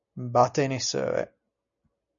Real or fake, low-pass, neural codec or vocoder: real; 7.2 kHz; none